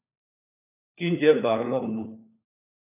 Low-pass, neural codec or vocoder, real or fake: 3.6 kHz; codec, 16 kHz, 4 kbps, FunCodec, trained on LibriTTS, 50 frames a second; fake